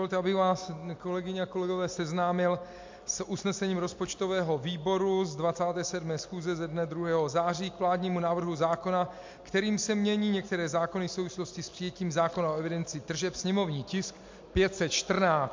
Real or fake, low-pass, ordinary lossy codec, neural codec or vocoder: real; 7.2 kHz; MP3, 48 kbps; none